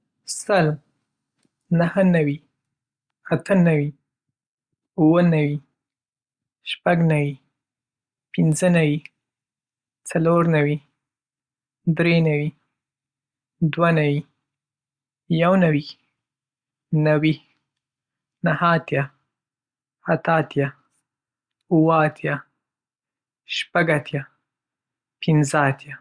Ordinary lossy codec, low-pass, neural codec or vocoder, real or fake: Opus, 64 kbps; 9.9 kHz; none; real